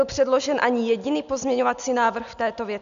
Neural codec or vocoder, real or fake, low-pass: none; real; 7.2 kHz